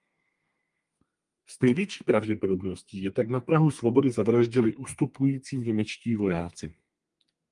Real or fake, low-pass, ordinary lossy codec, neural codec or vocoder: fake; 10.8 kHz; Opus, 32 kbps; codec, 32 kHz, 1.9 kbps, SNAC